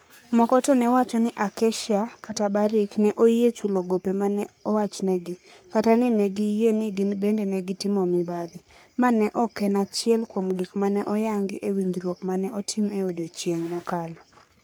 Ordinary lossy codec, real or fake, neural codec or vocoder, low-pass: none; fake; codec, 44.1 kHz, 3.4 kbps, Pupu-Codec; none